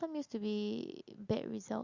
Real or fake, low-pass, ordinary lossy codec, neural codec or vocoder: real; 7.2 kHz; Opus, 64 kbps; none